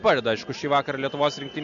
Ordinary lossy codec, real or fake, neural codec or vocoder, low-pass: Opus, 64 kbps; real; none; 7.2 kHz